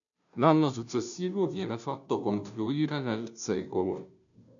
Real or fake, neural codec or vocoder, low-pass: fake; codec, 16 kHz, 0.5 kbps, FunCodec, trained on Chinese and English, 25 frames a second; 7.2 kHz